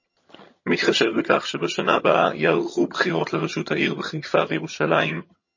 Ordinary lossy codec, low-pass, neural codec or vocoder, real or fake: MP3, 32 kbps; 7.2 kHz; vocoder, 22.05 kHz, 80 mel bands, HiFi-GAN; fake